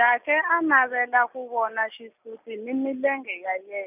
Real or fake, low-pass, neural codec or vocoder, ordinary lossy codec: real; 3.6 kHz; none; none